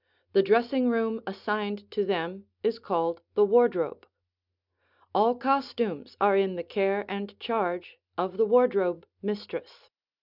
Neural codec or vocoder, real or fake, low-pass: none; real; 5.4 kHz